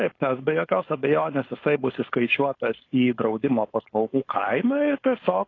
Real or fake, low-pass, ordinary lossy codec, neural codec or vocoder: fake; 7.2 kHz; AAC, 32 kbps; codec, 16 kHz, 4.8 kbps, FACodec